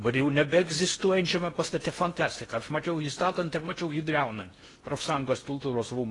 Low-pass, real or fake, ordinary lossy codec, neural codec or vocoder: 10.8 kHz; fake; AAC, 32 kbps; codec, 16 kHz in and 24 kHz out, 0.6 kbps, FocalCodec, streaming, 4096 codes